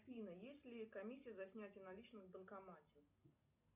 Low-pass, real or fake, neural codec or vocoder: 3.6 kHz; real; none